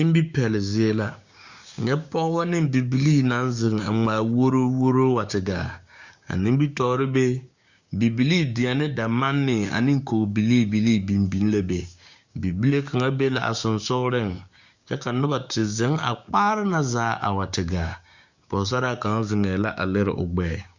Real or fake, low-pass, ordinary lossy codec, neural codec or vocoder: fake; 7.2 kHz; Opus, 64 kbps; codec, 16 kHz, 6 kbps, DAC